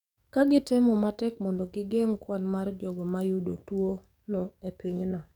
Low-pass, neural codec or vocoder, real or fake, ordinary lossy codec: 19.8 kHz; codec, 44.1 kHz, 7.8 kbps, DAC; fake; none